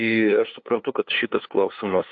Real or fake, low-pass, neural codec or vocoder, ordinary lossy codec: fake; 7.2 kHz; codec, 16 kHz, 2 kbps, FunCodec, trained on LibriTTS, 25 frames a second; AAC, 48 kbps